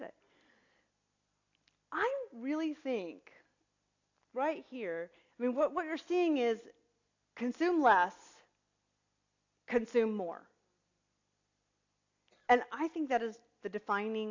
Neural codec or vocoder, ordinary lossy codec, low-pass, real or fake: none; AAC, 48 kbps; 7.2 kHz; real